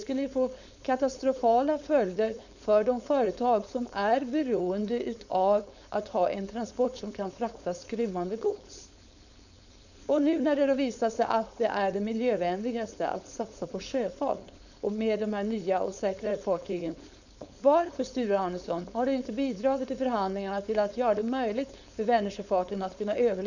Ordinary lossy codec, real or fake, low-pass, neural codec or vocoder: none; fake; 7.2 kHz; codec, 16 kHz, 4.8 kbps, FACodec